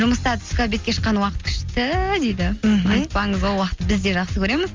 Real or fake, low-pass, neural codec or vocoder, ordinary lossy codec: real; 7.2 kHz; none; Opus, 32 kbps